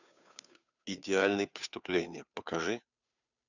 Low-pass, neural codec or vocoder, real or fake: 7.2 kHz; codec, 16 kHz, 2 kbps, FunCodec, trained on Chinese and English, 25 frames a second; fake